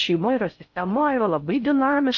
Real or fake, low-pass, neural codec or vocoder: fake; 7.2 kHz; codec, 16 kHz in and 24 kHz out, 0.6 kbps, FocalCodec, streaming, 4096 codes